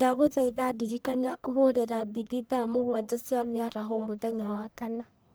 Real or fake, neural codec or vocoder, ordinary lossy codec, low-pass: fake; codec, 44.1 kHz, 1.7 kbps, Pupu-Codec; none; none